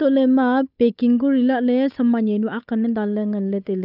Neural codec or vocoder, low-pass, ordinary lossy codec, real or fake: codec, 16 kHz, 8 kbps, FunCodec, trained on Chinese and English, 25 frames a second; 5.4 kHz; none; fake